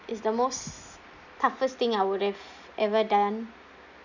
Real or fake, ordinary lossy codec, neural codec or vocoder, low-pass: real; none; none; 7.2 kHz